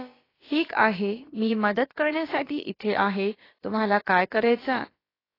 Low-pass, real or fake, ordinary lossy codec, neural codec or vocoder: 5.4 kHz; fake; AAC, 24 kbps; codec, 16 kHz, about 1 kbps, DyCAST, with the encoder's durations